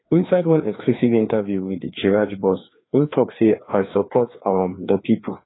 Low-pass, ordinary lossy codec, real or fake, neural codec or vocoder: 7.2 kHz; AAC, 16 kbps; fake; codec, 16 kHz, 2 kbps, FreqCodec, larger model